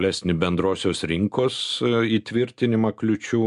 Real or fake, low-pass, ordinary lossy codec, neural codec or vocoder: real; 10.8 kHz; MP3, 64 kbps; none